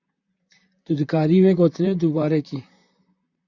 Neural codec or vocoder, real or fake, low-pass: vocoder, 44.1 kHz, 128 mel bands, Pupu-Vocoder; fake; 7.2 kHz